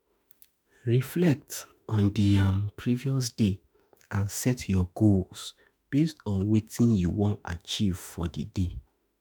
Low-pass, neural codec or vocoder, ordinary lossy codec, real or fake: none; autoencoder, 48 kHz, 32 numbers a frame, DAC-VAE, trained on Japanese speech; none; fake